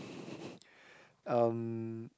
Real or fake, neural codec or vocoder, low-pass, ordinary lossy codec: real; none; none; none